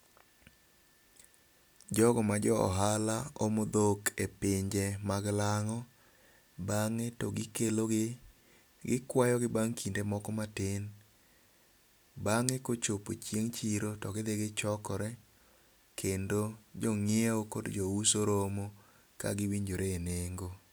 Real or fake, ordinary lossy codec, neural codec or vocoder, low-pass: real; none; none; none